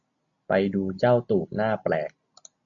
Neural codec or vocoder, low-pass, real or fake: none; 7.2 kHz; real